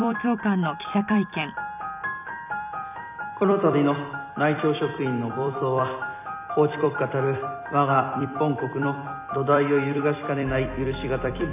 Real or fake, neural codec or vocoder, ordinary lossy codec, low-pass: fake; vocoder, 44.1 kHz, 128 mel bands every 256 samples, BigVGAN v2; none; 3.6 kHz